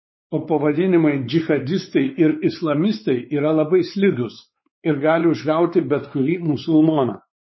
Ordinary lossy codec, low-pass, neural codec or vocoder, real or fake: MP3, 24 kbps; 7.2 kHz; codec, 16 kHz, 4 kbps, X-Codec, WavLM features, trained on Multilingual LibriSpeech; fake